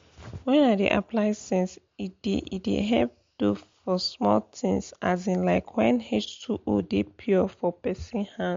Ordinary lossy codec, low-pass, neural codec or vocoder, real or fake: MP3, 48 kbps; 7.2 kHz; none; real